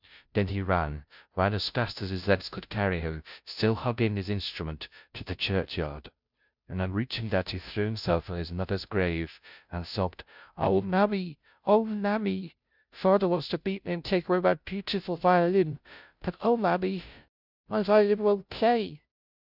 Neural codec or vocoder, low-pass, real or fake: codec, 16 kHz, 0.5 kbps, FunCodec, trained on Chinese and English, 25 frames a second; 5.4 kHz; fake